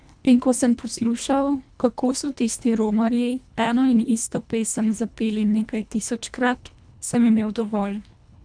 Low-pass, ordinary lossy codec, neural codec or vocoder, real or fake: 9.9 kHz; none; codec, 24 kHz, 1.5 kbps, HILCodec; fake